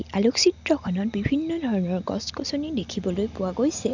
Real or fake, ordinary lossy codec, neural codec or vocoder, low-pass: real; none; none; 7.2 kHz